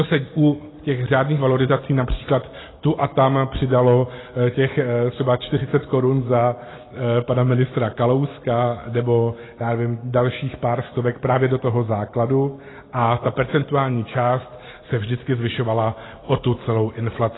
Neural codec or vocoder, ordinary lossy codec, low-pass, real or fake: none; AAC, 16 kbps; 7.2 kHz; real